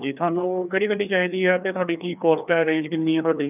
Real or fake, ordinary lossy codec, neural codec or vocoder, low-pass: fake; none; codec, 16 kHz, 2 kbps, FreqCodec, larger model; 3.6 kHz